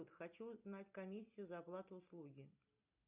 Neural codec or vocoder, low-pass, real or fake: none; 3.6 kHz; real